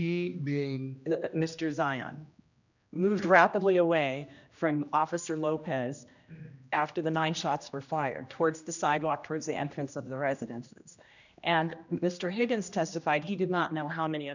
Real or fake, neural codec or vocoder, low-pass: fake; codec, 16 kHz, 1 kbps, X-Codec, HuBERT features, trained on general audio; 7.2 kHz